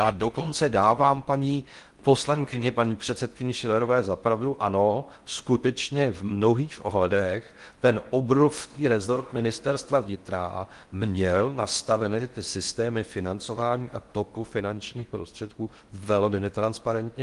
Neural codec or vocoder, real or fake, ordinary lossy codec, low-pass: codec, 16 kHz in and 24 kHz out, 0.6 kbps, FocalCodec, streaming, 4096 codes; fake; Opus, 24 kbps; 10.8 kHz